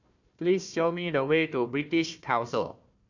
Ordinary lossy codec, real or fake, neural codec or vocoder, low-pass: none; fake; codec, 16 kHz, 1 kbps, FunCodec, trained on Chinese and English, 50 frames a second; 7.2 kHz